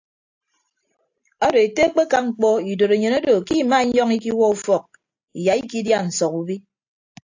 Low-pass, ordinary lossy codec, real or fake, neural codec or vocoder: 7.2 kHz; AAC, 48 kbps; real; none